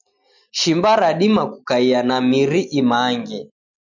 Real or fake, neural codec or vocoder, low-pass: real; none; 7.2 kHz